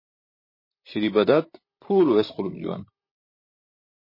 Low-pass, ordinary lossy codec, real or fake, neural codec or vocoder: 5.4 kHz; MP3, 24 kbps; fake; vocoder, 44.1 kHz, 128 mel bands, Pupu-Vocoder